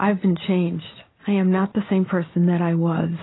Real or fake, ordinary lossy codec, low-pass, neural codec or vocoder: real; AAC, 16 kbps; 7.2 kHz; none